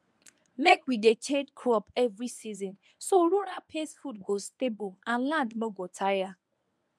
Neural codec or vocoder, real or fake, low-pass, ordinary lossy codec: codec, 24 kHz, 0.9 kbps, WavTokenizer, medium speech release version 1; fake; none; none